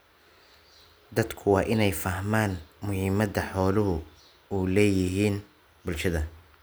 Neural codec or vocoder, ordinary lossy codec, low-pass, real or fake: none; none; none; real